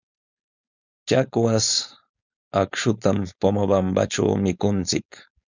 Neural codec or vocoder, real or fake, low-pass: codec, 16 kHz, 4.8 kbps, FACodec; fake; 7.2 kHz